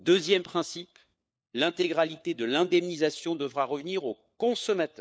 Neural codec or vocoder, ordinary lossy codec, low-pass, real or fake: codec, 16 kHz, 4 kbps, FunCodec, trained on LibriTTS, 50 frames a second; none; none; fake